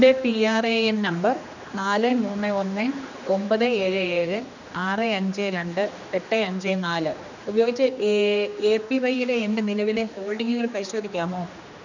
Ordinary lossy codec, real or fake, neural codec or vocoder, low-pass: none; fake; codec, 16 kHz, 2 kbps, X-Codec, HuBERT features, trained on general audio; 7.2 kHz